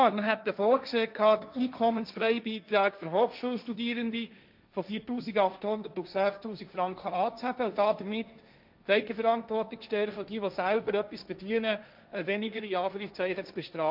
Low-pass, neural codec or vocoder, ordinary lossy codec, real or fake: 5.4 kHz; codec, 16 kHz, 1.1 kbps, Voila-Tokenizer; none; fake